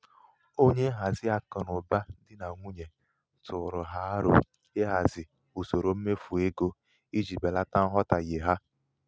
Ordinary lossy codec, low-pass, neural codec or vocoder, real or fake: none; none; none; real